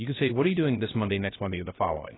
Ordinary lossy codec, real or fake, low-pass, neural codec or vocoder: AAC, 16 kbps; fake; 7.2 kHz; codec, 16 kHz, 0.3 kbps, FocalCodec